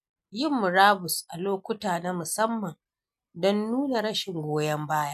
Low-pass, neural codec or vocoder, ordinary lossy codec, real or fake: 14.4 kHz; none; none; real